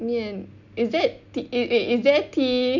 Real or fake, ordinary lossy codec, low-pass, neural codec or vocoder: real; none; 7.2 kHz; none